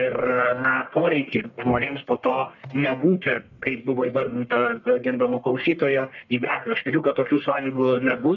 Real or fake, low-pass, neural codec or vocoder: fake; 7.2 kHz; codec, 44.1 kHz, 1.7 kbps, Pupu-Codec